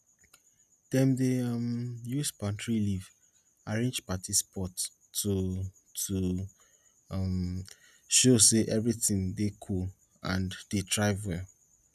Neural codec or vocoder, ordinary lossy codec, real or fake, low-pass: none; none; real; 14.4 kHz